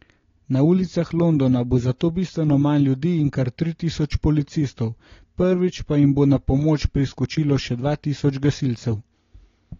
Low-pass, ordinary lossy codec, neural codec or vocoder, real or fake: 7.2 kHz; AAC, 32 kbps; none; real